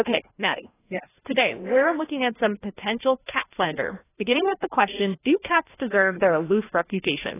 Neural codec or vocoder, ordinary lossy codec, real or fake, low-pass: codec, 16 kHz, 1 kbps, FreqCodec, larger model; AAC, 16 kbps; fake; 3.6 kHz